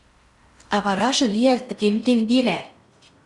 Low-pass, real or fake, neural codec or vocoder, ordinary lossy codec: 10.8 kHz; fake; codec, 16 kHz in and 24 kHz out, 0.6 kbps, FocalCodec, streaming, 4096 codes; Opus, 64 kbps